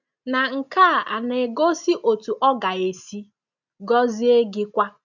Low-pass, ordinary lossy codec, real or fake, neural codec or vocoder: 7.2 kHz; none; real; none